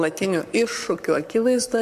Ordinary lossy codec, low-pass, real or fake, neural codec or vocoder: MP3, 96 kbps; 14.4 kHz; fake; codec, 44.1 kHz, 7.8 kbps, Pupu-Codec